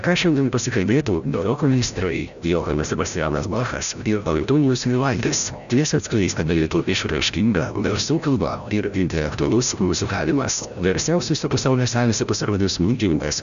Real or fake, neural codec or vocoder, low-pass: fake; codec, 16 kHz, 0.5 kbps, FreqCodec, larger model; 7.2 kHz